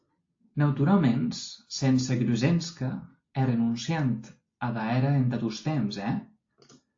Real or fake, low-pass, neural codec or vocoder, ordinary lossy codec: real; 7.2 kHz; none; AAC, 48 kbps